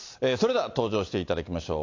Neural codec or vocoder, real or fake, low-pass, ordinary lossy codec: none; real; 7.2 kHz; AAC, 48 kbps